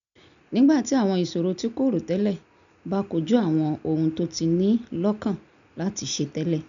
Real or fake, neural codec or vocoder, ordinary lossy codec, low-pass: real; none; none; 7.2 kHz